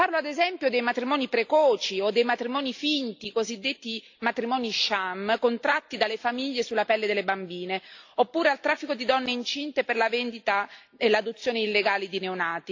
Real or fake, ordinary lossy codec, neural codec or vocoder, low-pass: real; AAC, 48 kbps; none; 7.2 kHz